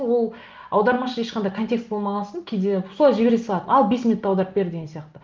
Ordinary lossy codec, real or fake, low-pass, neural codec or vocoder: Opus, 32 kbps; real; 7.2 kHz; none